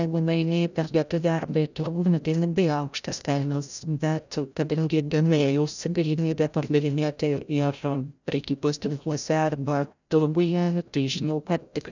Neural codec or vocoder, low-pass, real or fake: codec, 16 kHz, 0.5 kbps, FreqCodec, larger model; 7.2 kHz; fake